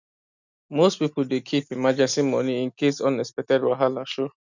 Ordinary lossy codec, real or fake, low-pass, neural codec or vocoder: none; real; 7.2 kHz; none